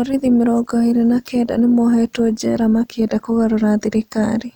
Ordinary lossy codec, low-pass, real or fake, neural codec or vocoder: none; 19.8 kHz; real; none